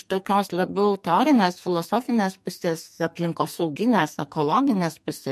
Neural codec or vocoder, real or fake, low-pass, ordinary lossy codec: codec, 44.1 kHz, 2.6 kbps, SNAC; fake; 14.4 kHz; MP3, 64 kbps